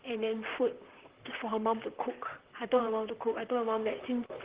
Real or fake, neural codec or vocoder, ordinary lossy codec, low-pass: fake; vocoder, 44.1 kHz, 128 mel bands, Pupu-Vocoder; Opus, 16 kbps; 3.6 kHz